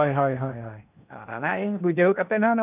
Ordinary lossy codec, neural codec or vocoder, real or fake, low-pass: none; codec, 16 kHz in and 24 kHz out, 0.8 kbps, FocalCodec, streaming, 65536 codes; fake; 3.6 kHz